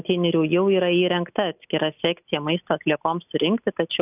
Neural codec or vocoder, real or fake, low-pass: none; real; 3.6 kHz